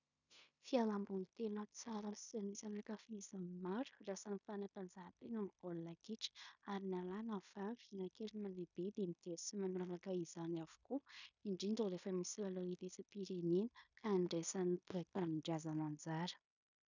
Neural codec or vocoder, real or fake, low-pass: codec, 16 kHz in and 24 kHz out, 0.9 kbps, LongCat-Audio-Codec, fine tuned four codebook decoder; fake; 7.2 kHz